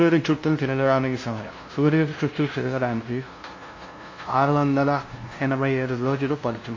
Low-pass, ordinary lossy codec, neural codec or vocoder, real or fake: 7.2 kHz; MP3, 32 kbps; codec, 16 kHz, 0.5 kbps, FunCodec, trained on LibriTTS, 25 frames a second; fake